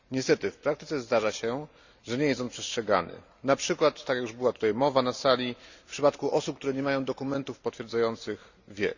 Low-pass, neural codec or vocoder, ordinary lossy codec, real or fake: 7.2 kHz; none; Opus, 64 kbps; real